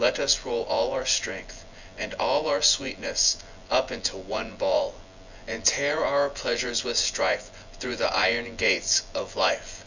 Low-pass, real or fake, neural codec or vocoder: 7.2 kHz; fake; vocoder, 24 kHz, 100 mel bands, Vocos